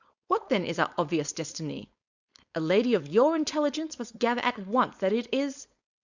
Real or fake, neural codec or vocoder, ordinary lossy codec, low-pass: fake; codec, 16 kHz, 4.8 kbps, FACodec; Opus, 64 kbps; 7.2 kHz